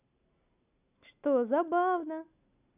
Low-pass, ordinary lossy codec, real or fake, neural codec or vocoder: 3.6 kHz; none; real; none